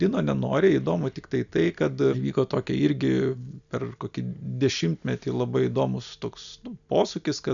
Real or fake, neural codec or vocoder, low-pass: real; none; 7.2 kHz